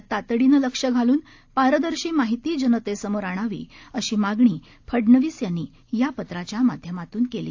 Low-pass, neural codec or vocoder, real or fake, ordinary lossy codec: 7.2 kHz; none; real; AAC, 48 kbps